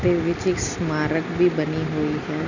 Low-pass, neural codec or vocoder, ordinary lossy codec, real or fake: 7.2 kHz; none; none; real